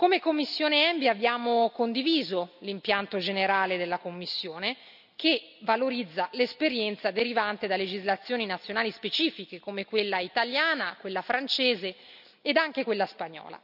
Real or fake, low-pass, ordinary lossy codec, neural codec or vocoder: real; 5.4 kHz; none; none